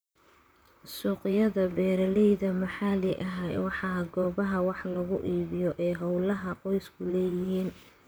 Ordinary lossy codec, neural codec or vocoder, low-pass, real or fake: none; vocoder, 44.1 kHz, 128 mel bands, Pupu-Vocoder; none; fake